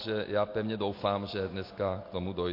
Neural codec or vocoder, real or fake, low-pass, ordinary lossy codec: none; real; 5.4 kHz; MP3, 32 kbps